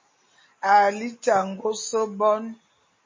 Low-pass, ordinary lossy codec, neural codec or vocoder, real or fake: 7.2 kHz; MP3, 32 kbps; none; real